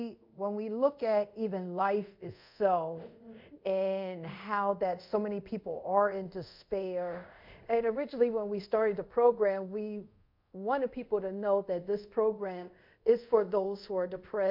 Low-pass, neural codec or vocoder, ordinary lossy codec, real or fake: 5.4 kHz; codec, 24 kHz, 0.5 kbps, DualCodec; MP3, 48 kbps; fake